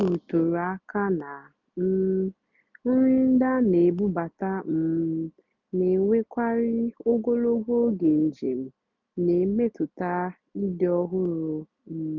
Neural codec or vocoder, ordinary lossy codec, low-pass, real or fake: none; none; 7.2 kHz; real